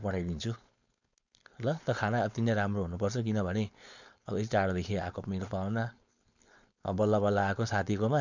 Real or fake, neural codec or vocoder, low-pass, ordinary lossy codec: fake; codec, 16 kHz, 4.8 kbps, FACodec; 7.2 kHz; none